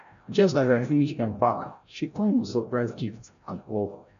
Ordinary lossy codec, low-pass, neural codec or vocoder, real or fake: none; 7.2 kHz; codec, 16 kHz, 0.5 kbps, FreqCodec, larger model; fake